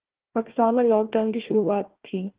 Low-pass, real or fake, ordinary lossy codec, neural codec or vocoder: 3.6 kHz; fake; Opus, 16 kbps; codec, 16 kHz, 1 kbps, FunCodec, trained on Chinese and English, 50 frames a second